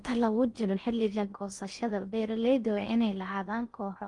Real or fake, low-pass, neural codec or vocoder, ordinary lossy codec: fake; 10.8 kHz; codec, 16 kHz in and 24 kHz out, 0.6 kbps, FocalCodec, streaming, 4096 codes; Opus, 32 kbps